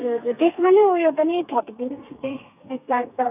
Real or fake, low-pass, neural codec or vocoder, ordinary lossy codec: fake; 3.6 kHz; codec, 32 kHz, 1.9 kbps, SNAC; none